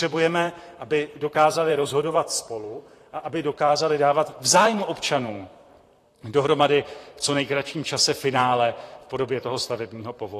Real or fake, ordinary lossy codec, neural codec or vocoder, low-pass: fake; AAC, 48 kbps; vocoder, 44.1 kHz, 128 mel bands, Pupu-Vocoder; 14.4 kHz